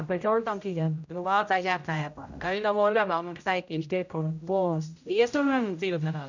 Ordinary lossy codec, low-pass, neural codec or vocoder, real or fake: none; 7.2 kHz; codec, 16 kHz, 0.5 kbps, X-Codec, HuBERT features, trained on general audio; fake